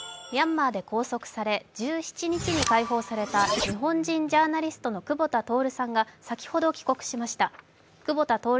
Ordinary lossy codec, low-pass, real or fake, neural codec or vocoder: none; none; real; none